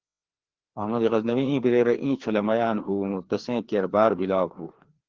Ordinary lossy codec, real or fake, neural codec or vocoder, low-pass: Opus, 16 kbps; fake; codec, 16 kHz, 2 kbps, FreqCodec, larger model; 7.2 kHz